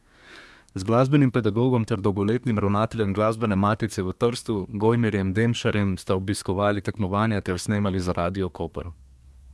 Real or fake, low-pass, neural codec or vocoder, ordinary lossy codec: fake; none; codec, 24 kHz, 1 kbps, SNAC; none